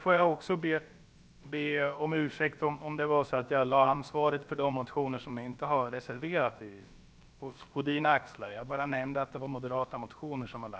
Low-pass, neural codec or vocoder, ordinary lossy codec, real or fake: none; codec, 16 kHz, about 1 kbps, DyCAST, with the encoder's durations; none; fake